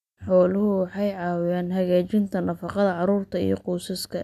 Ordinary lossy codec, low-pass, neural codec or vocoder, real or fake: none; 14.4 kHz; none; real